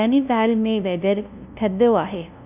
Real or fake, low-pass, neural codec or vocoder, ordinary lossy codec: fake; 3.6 kHz; codec, 16 kHz, 0.5 kbps, FunCodec, trained on LibriTTS, 25 frames a second; none